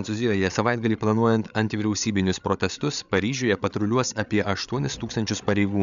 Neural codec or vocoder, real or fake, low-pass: codec, 16 kHz, 8 kbps, FreqCodec, larger model; fake; 7.2 kHz